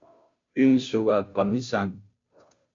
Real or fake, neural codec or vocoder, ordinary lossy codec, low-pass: fake; codec, 16 kHz, 0.5 kbps, FunCodec, trained on Chinese and English, 25 frames a second; MP3, 48 kbps; 7.2 kHz